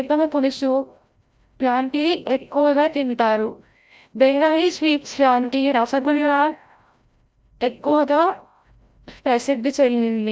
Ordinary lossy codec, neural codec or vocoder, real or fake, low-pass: none; codec, 16 kHz, 0.5 kbps, FreqCodec, larger model; fake; none